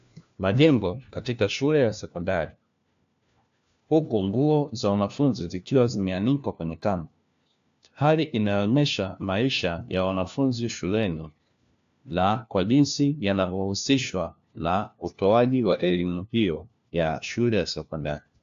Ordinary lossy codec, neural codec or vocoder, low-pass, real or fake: AAC, 64 kbps; codec, 16 kHz, 1 kbps, FunCodec, trained on LibriTTS, 50 frames a second; 7.2 kHz; fake